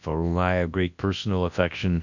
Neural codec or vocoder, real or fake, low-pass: codec, 24 kHz, 0.9 kbps, WavTokenizer, large speech release; fake; 7.2 kHz